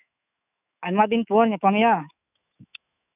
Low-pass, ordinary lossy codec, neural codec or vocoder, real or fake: 3.6 kHz; none; autoencoder, 48 kHz, 128 numbers a frame, DAC-VAE, trained on Japanese speech; fake